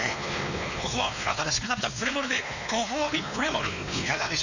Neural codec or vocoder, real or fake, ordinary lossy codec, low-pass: codec, 16 kHz, 2 kbps, X-Codec, WavLM features, trained on Multilingual LibriSpeech; fake; none; 7.2 kHz